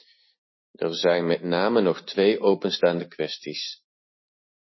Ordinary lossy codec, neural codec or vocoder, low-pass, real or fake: MP3, 24 kbps; none; 7.2 kHz; real